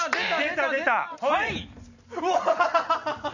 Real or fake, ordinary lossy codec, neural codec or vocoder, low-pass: real; none; none; 7.2 kHz